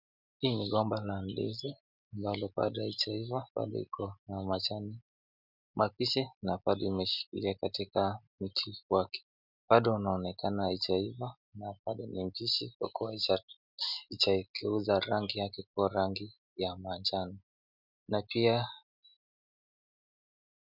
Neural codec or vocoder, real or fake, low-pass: none; real; 5.4 kHz